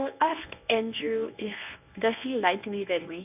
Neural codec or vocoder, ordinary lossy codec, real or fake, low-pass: codec, 24 kHz, 0.9 kbps, WavTokenizer, medium speech release version 1; none; fake; 3.6 kHz